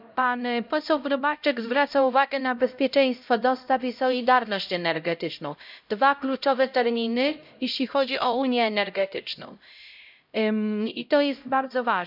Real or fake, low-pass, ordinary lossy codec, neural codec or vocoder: fake; 5.4 kHz; none; codec, 16 kHz, 0.5 kbps, X-Codec, HuBERT features, trained on LibriSpeech